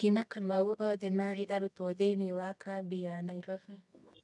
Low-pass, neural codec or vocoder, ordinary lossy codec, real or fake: 10.8 kHz; codec, 24 kHz, 0.9 kbps, WavTokenizer, medium music audio release; none; fake